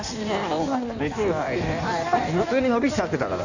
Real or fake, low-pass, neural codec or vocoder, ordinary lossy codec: fake; 7.2 kHz; codec, 16 kHz in and 24 kHz out, 1.1 kbps, FireRedTTS-2 codec; none